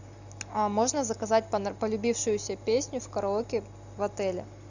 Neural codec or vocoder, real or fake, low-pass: none; real; 7.2 kHz